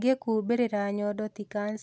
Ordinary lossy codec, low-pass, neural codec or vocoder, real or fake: none; none; none; real